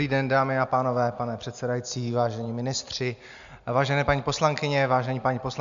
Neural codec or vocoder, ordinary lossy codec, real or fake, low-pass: none; MP3, 64 kbps; real; 7.2 kHz